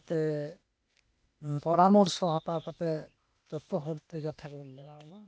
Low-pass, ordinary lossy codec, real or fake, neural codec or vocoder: none; none; fake; codec, 16 kHz, 0.8 kbps, ZipCodec